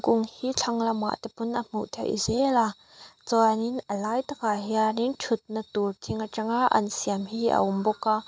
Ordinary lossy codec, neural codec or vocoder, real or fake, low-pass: none; none; real; none